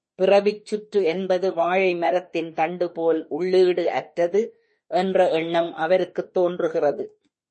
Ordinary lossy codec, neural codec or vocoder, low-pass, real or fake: MP3, 32 kbps; autoencoder, 48 kHz, 32 numbers a frame, DAC-VAE, trained on Japanese speech; 10.8 kHz; fake